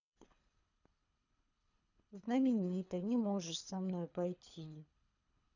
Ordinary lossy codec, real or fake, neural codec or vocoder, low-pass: none; fake; codec, 24 kHz, 3 kbps, HILCodec; 7.2 kHz